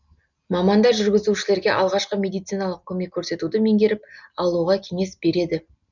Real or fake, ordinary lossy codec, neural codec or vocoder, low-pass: real; none; none; 7.2 kHz